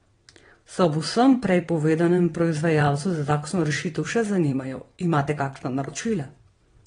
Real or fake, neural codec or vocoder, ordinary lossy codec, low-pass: fake; vocoder, 22.05 kHz, 80 mel bands, WaveNeXt; AAC, 32 kbps; 9.9 kHz